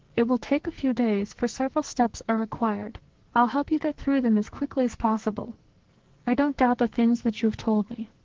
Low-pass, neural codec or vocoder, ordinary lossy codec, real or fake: 7.2 kHz; codec, 44.1 kHz, 2.6 kbps, SNAC; Opus, 16 kbps; fake